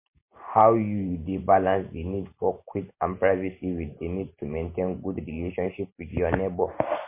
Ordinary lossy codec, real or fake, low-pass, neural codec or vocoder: MP3, 24 kbps; real; 3.6 kHz; none